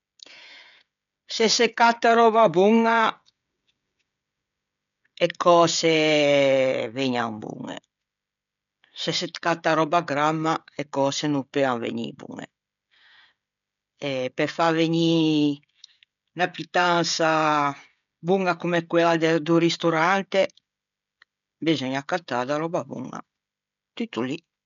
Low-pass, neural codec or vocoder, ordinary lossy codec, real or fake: 7.2 kHz; codec, 16 kHz, 16 kbps, FreqCodec, smaller model; none; fake